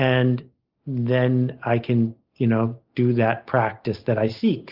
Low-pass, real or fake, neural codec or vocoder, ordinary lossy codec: 5.4 kHz; real; none; Opus, 24 kbps